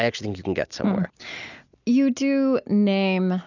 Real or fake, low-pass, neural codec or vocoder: real; 7.2 kHz; none